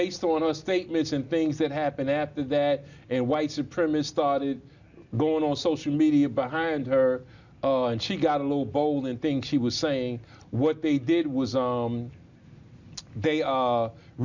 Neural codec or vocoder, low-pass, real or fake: none; 7.2 kHz; real